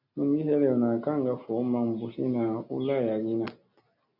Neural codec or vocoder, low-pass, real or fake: none; 5.4 kHz; real